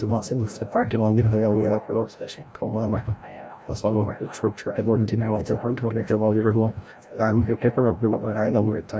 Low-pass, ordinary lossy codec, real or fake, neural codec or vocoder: none; none; fake; codec, 16 kHz, 0.5 kbps, FreqCodec, larger model